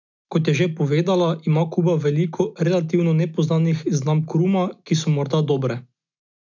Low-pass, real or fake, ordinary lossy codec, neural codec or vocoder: 7.2 kHz; real; none; none